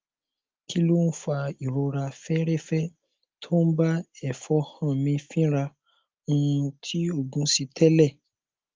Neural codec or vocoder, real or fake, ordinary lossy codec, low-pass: none; real; Opus, 32 kbps; 7.2 kHz